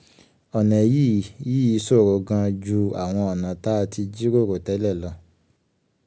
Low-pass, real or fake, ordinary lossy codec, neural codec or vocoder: none; real; none; none